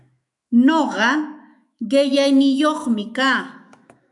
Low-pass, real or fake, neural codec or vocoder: 10.8 kHz; fake; autoencoder, 48 kHz, 128 numbers a frame, DAC-VAE, trained on Japanese speech